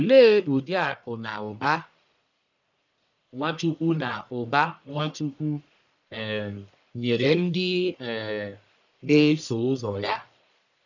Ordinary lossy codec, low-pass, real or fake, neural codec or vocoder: none; 7.2 kHz; fake; codec, 44.1 kHz, 1.7 kbps, Pupu-Codec